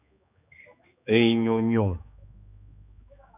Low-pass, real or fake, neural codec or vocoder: 3.6 kHz; fake; codec, 16 kHz, 2 kbps, X-Codec, HuBERT features, trained on general audio